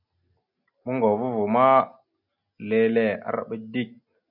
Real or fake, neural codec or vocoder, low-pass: real; none; 5.4 kHz